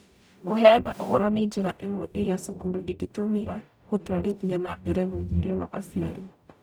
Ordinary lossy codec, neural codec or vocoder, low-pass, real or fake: none; codec, 44.1 kHz, 0.9 kbps, DAC; none; fake